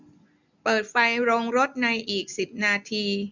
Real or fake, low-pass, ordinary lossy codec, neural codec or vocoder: real; 7.2 kHz; none; none